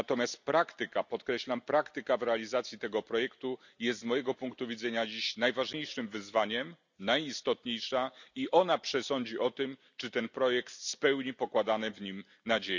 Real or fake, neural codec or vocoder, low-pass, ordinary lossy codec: real; none; 7.2 kHz; none